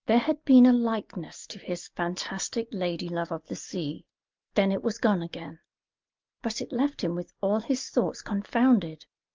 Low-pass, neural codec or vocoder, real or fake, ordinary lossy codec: 7.2 kHz; none; real; Opus, 24 kbps